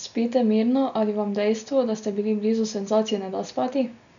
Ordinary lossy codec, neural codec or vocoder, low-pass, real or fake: none; none; 7.2 kHz; real